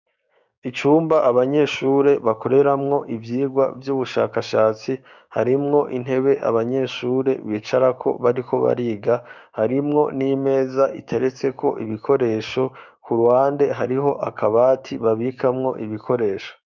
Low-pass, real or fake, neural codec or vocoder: 7.2 kHz; fake; codec, 16 kHz, 6 kbps, DAC